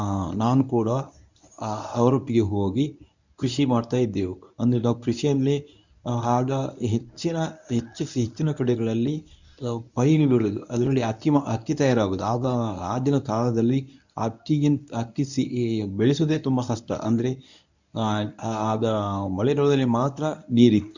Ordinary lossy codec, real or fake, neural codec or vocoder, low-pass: none; fake; codec, 24 kHz, 0.9 kbps, WavTokenizer, medium speech release version 1; 7.2 kHz